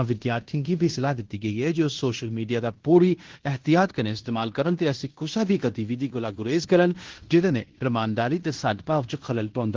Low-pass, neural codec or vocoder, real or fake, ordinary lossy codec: 7.2 kHz; codec, 16 kHz in and 24 kHz out, 0.9 kbps, LongCat-Audio-Codec, fine tuned four codebook decoder; fake; Opus, 16 kbps